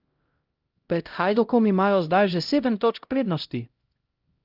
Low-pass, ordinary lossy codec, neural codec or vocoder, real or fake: 5.4 kHz; Opus, 24 kbps; codec, 16 kHz, 0.5 kbps, X-Codec, HuBERT features, trained on LibriSpeech; fake